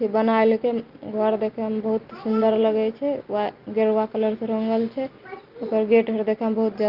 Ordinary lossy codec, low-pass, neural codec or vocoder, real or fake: Opus, 32 kbps; 5.4 kHz; none; real